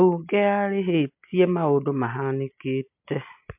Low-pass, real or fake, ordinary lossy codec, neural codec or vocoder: 3.6 kHz; real; MP3, 32 kbps; none